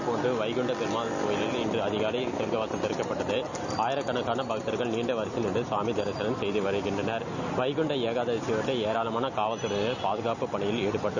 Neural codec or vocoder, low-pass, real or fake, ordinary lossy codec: none; 7.2 kHz; real; none